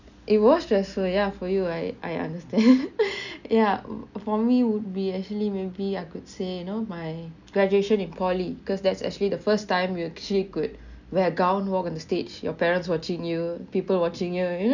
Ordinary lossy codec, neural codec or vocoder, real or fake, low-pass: none; none; real; 7.2 kHz